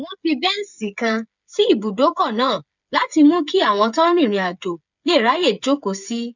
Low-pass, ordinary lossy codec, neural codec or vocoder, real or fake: 7.2 kHz; none; codec, 16 kHz, 8 kbps, FreqCodec, smaller model; fake